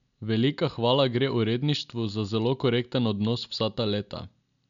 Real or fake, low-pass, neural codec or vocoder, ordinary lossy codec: real; 7.2 kHz; none; none